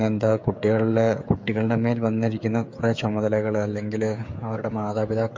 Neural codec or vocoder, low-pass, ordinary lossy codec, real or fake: codec, 16 kHz, 16 kbps, FreqCodec, smaller model; 7.2 kHz; MP3, 48 kbps; fake